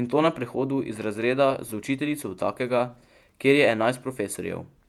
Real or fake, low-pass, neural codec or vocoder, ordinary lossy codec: fake; 19.8 kHz; vocoder, 48 kHz, 128 mel bands, Vocos; none